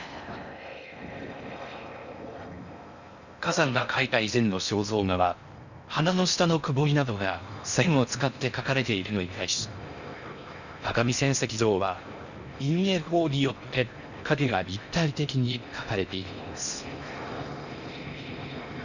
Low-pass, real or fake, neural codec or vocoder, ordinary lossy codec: 7.2 kHz; fake; codec, 16 kHz in and 24 kHz out, 0.6 kbps, FocalCodec, streaming, 4096 codes; none